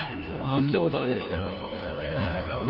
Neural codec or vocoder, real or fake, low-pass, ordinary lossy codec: codec, 16 kHz, 1 kbps, FunCodec, trained on LibriTTS, 50 frames a second; fake; 5.4 kHz; none